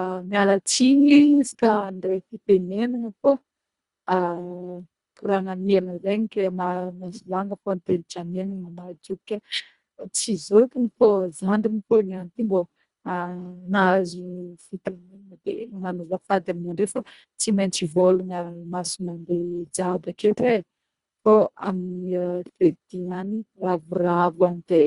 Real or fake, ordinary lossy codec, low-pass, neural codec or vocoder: fake; Opus, 64 kbps; 10.8 kHz; codec, 24 kHz, 1.5 kbps, HILCodec